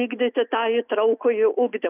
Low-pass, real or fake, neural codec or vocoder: 3.6 kHz; real; none